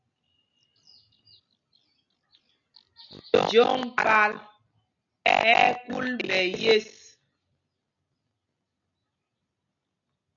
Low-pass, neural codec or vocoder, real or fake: 7.2 kHz; none; real